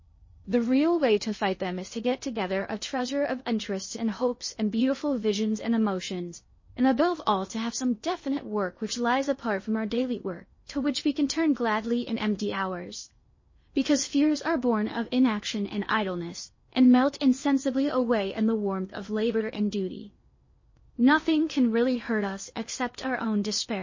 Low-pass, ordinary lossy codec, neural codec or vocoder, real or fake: 7.2 kHz; MP3, 32 kbps; codec, 16 kHz in and 24 kHz out, 0.6 kbps, FocalCodec, streaming, 2048 codes; fake